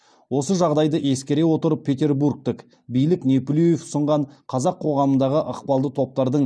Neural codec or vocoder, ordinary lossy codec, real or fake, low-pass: none; none; real; none